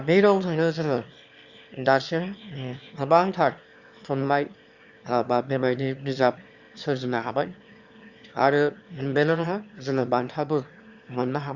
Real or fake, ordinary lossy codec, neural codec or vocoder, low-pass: fake; Opus, 64 kbps; autoencoder, 22.05 kHz, a latent of 192 numbers a frame, VITS, trained on one speaker; 7.2 kHz